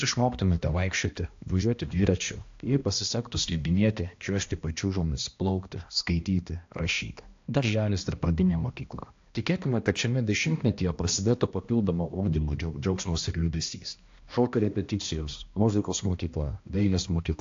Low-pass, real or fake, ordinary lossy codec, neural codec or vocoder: 7.2 kHz; fake; AAC, 48 kbps; codec, 16 kHz, 1 kbps, X-Codec, HuBERT features, trained on balanced general audio